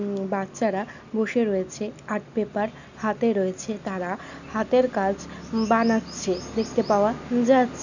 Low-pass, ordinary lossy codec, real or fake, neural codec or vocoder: 7.2 kHz; none; real; none